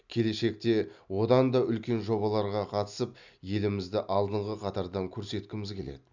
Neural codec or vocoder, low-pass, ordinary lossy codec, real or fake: none; 7.2 kHz; none; real